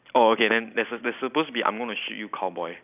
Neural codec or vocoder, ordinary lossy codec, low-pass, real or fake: none; none; 3.6 kHz; real